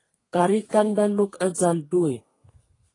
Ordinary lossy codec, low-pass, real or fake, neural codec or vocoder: AAC, 32 kbps; 10.8 kHz; fake; codec, 32 kHz, 1.9 kbps, SNAC